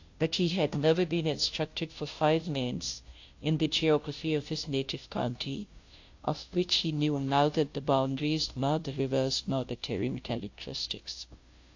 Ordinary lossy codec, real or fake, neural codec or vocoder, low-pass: AAC, 48 kbps; fake; codec, 16 kHz, 0.5 kbps, FunCodec, trained on Chinese and English, 25 frames a second; 7.2 kHz